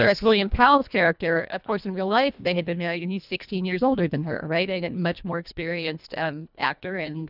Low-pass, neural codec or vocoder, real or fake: 5.4 kHz; codec, 24 kHz, 1.5 kbps, HILCodec; fake